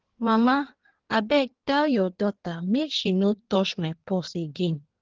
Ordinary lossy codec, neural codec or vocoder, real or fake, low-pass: Opus, 16 kbps; codec, 16 kHz in and 24 kHz out, 1.1 kbps, FireRedTTS-2 codec; fake; 7.2 kHz